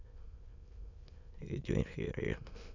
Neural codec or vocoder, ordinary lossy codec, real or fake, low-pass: autoencoder, 22.05 kHz, a latent of 192 numbers a frame, VITS, trained on many speakers; none; fake; 7.2 kHz